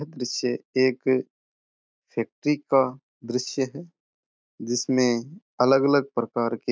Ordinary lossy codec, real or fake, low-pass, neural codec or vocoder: none; real; none; none